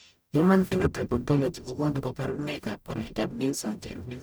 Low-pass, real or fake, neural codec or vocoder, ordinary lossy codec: none; fake; codec, 44.1 kHz, 0.9 kbps, DAC; none